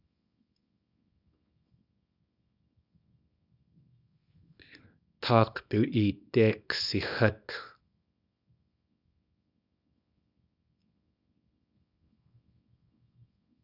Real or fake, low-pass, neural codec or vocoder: fake; 5.4 kHz; codec, 24 kHz, 0.9 kbps, WavTokenizer, small release